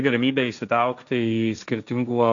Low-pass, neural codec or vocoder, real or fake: 7.2 kHz; codec, 16 kHz, 1.1 kbps, Voila-Tokenizer; fake